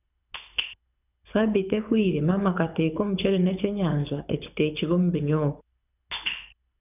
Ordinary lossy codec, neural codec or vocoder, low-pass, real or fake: none; codec, 24 kHz, 6 kbps, HILCodec; 3.6 kHz; fake